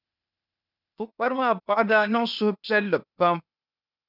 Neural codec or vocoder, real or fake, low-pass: codec, 16 kHz, 0.8 kbps, ZipCodec; fake; 5.4 kHz